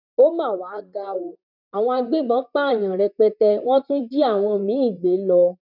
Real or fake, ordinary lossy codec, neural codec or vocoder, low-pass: fake; none; vocoder, 44.1 kHz, 128 mel bands, Pupu-Vocoder; 5.4 kHz